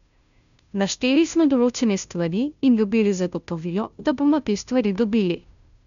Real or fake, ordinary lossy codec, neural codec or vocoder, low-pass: fake; none; codec, 16 kHz, 0.5 kbps, FunCodec, trained on Chinese and English, 25 frames a second; 7.2 kHz